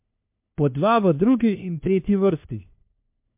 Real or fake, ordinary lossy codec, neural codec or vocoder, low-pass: fake; MP3, 32 kbps; codec, 16 kHz, 1 kbps, FunCodec, trained on LibriTTS, 50 frames a second; 3.6 kHz